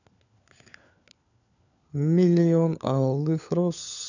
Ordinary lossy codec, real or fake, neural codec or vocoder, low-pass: none; fake; codec, 16 kHz, 4 kbps, FunCodec, trained on LibriTTS, 50 frames a second; 7.2 kHz